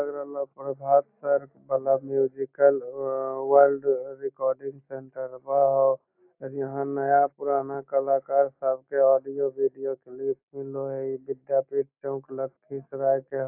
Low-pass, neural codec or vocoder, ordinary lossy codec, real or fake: 3.6 kHz; none; AAC, 32 kbps; real